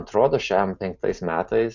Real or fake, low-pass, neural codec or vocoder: real; 7.2 kHz; none